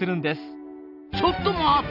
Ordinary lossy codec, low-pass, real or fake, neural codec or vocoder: none; 5.4 kHz; real; none